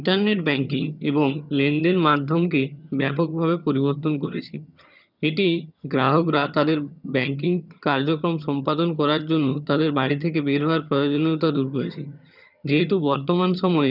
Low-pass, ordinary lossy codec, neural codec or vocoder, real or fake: 5.4 kHz; none; vocoder, 22.05 kHz, 80 mel bands, HiFi-GAN; fake